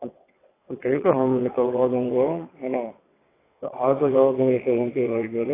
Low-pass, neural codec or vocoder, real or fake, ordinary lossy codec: 3.6 kHz; vocoder, 22.05 kHz, 80 mel bands, WaveNeXt; fake; AAC, 16 kbps